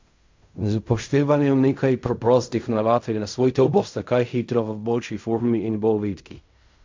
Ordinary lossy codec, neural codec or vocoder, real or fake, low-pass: MP3, 64 kbps; codec, 16 kHz in and 24 kHz out, 0.4 kbps, LongCat-Audio-Codec, fine tuned four codebook decoder; fake; 7.2 kHz